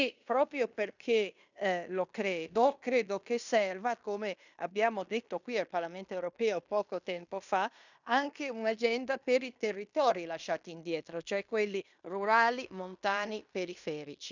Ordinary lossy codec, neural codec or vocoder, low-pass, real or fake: none; codec, 16 kHz in and 24 kHz out, 0.9 kbps, LongCat-Audio-Codec, fine tuned four codebook decoder; 7.2 kHz; fake